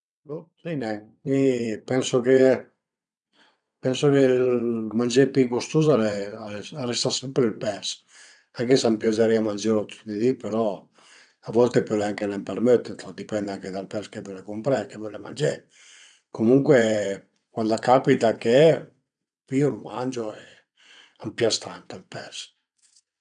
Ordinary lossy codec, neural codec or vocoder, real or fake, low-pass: none; vocoder, 22.05 kHz, 80 mel bands, WaveNeXt; fake; 9.9 kHz